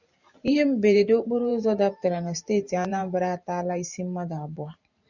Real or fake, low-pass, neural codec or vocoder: fake; 7.2 kHz; vocoder, 44.1 kHz, 128 mel bands every 512 samples, BigVGAN v2